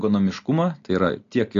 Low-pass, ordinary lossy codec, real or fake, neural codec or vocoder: 7.2 kHz; AAC, 48 kbps; real; none